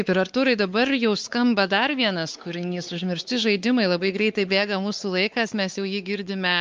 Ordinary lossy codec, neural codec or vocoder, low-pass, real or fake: Opus, 24 kbps; codec, 16 kHz, 4 kbps, X-Codec, WavLM features, trained on Multilingual LibriSpeech; 7.2 kHz; fake